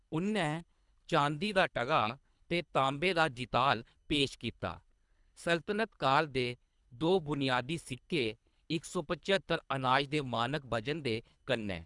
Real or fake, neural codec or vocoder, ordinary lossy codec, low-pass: fake; codec, 24 kHz, 3 kbps, HILCodec; none; 10.8 kHz